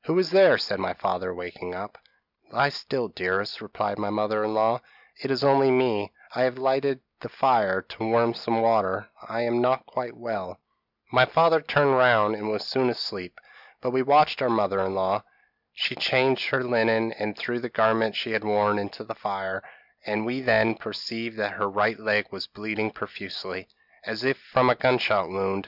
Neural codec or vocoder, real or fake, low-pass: none; real; 5.4 kHz